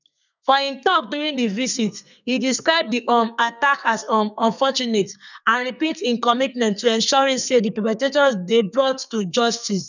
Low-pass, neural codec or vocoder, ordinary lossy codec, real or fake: 7.2 kHz; codec, 32 kHz, 1.9 kbps, SNAC; none; fake